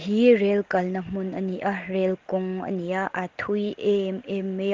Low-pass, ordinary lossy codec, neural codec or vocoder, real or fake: 7.2 kHz; Opus, 16 kbps; none; real